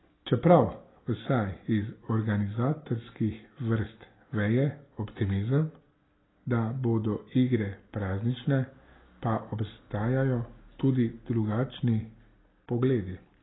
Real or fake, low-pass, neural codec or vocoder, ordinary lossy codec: real; 7.2 kHz; none; AAC, 16 kbps